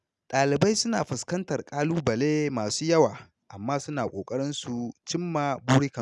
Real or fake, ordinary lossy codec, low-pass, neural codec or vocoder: real; none; none; none